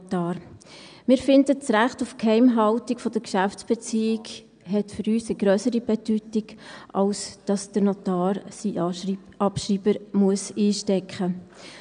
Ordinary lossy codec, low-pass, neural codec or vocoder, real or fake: none; 9.9 kHz; none; real